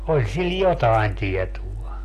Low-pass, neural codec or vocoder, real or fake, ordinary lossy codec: 14.4 kHz; none; real; AAC, 48 kbps